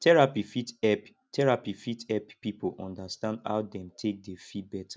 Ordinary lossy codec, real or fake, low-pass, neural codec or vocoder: none; real; none; none